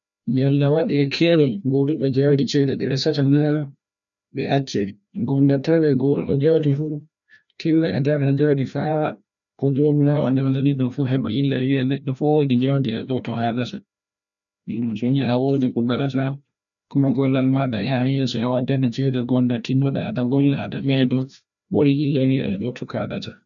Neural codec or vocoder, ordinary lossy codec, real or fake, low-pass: codec, 16 kHz, 1 kbps, FreqCodec, larger model; none; fake; 7.2 kHz